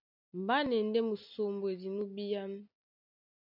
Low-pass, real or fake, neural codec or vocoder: 5.4 kHz; real; none